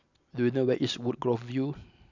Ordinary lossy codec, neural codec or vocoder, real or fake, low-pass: none; none; real; 7.2 kHz